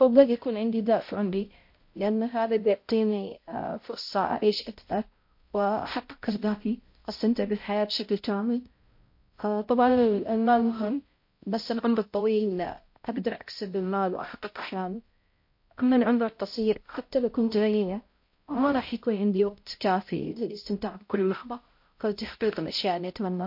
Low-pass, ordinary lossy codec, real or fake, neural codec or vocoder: 5.4 kHz; MP3, 32 kbps; fake; codec, 16 kHz, 0.5 kbps, X-Codec, HuBERT features, trained on balanced general audio